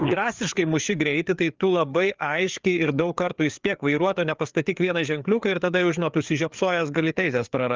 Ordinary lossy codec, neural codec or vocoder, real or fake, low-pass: Opus, 32 kbps; codec, 16 kHz, 4 kbps, FreqCodec, larger model; fake; 7.2 kHz